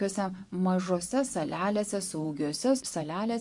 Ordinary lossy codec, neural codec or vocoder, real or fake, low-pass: MP3, 64 kbps; vocoder, 24 kHz, 100 mel bands, Vocos; fake; 10.8 kHz